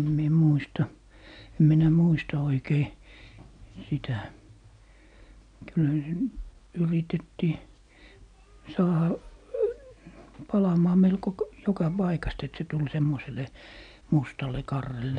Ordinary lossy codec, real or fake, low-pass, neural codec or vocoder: none; real; 9.9 kHz; none